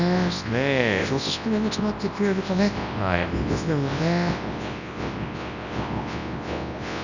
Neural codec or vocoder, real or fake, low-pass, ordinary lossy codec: codec, 24 kHz, 0.9 kbps, WavTokenizer, large speech release; fake; 7.2 kHz; none